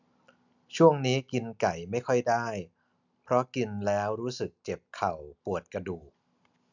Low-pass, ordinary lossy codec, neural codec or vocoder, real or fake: 7.2 kHz; none; none; real